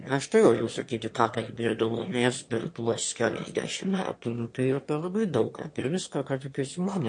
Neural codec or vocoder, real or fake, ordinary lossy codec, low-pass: autoencoder, 22.05 kHz, a latent of 192 numbers a frame, VITS, trained on one speaker; fake; MP3, 48 kbps; 9.9 kHz